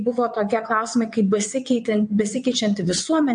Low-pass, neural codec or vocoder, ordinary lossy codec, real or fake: 9.9 kHz; vocoder, 22.05 kHz, 80 mel bands, WaveNeXt; MP3, 48 kbps; fake